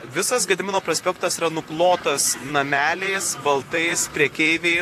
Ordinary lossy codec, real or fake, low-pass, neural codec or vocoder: AAC, 64 kbps; fake; 14.4 kHz; vocoder, 44.1 kHz, 128 mel bands, Pupu-Vocoder